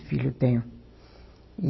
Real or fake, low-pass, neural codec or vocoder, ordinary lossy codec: real; 7.2 kHz; none; MP3, 24 kbps